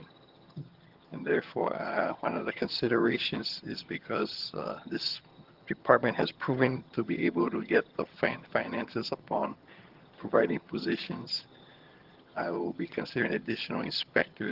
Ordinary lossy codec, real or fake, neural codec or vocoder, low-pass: Opus, 16 kbps; fake; vocoder, 22.05 kHz, 80 mel bands, HiFi-GAN; 5.4 kHz